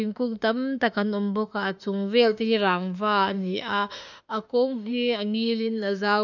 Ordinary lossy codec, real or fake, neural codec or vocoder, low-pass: none; fake; autoencoder, 48 kHz, 32 numbers a frame, DAC-VAE, trained on Japanese speech; 7.2 kHz